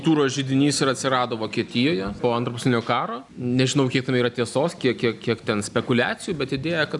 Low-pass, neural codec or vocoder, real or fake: 10.8 kHz; none; real